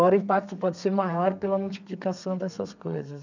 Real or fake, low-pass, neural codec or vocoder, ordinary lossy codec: fake; 7.2 kHz; codec, 32 kHz, 1.9 kbps, SNAC; none